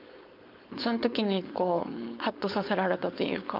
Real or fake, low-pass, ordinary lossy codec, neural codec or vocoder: fake; 5.4 kHz; none; codec, 16 kHz, 4.8 kbps, FACodec